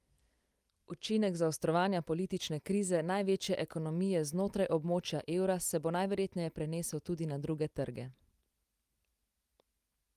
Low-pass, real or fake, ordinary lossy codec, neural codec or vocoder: 14.4 kHz; real; Opus, 32 kbps; none